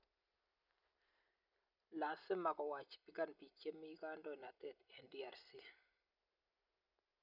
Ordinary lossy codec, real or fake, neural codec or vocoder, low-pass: none; real; none; 5.4 kHz